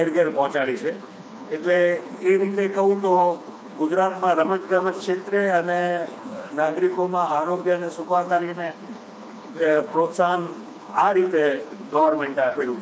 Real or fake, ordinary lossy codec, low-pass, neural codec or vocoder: fake; none; none; codec, 16 kHz, 2 kbps, FreqCodec, smaller model